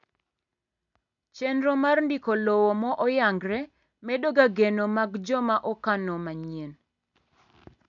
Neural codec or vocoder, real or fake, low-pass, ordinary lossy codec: none; real; 7.2 kHz; none